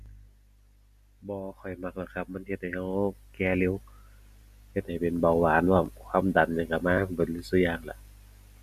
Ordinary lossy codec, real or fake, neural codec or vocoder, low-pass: none; real; none; 14.4 kHz